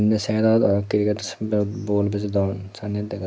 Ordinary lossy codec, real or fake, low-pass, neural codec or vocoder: none; real; none; none